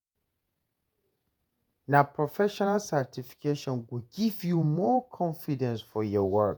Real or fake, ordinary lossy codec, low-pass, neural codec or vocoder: fake; none; none; vocoder, 48 kHz, 128 mel bands, Vocos